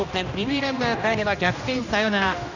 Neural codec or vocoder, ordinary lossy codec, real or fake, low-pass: codec, 16 kHz, 1 kbps, X-Codec, HuBERT features, trained on general audio; none; fake; 7.2 kHz